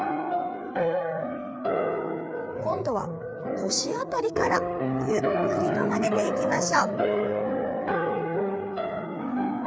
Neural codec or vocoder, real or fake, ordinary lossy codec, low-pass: codec, 16 kHz, 4 kbps, FreqCodec, larger model; fake; none; none